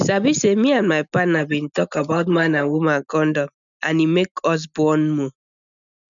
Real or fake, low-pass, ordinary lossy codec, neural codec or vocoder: real; 7.2 kHz; none; none